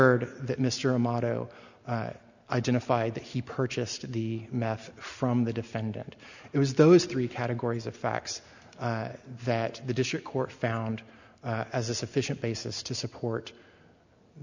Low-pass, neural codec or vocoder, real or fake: 7.2 kHz; none; real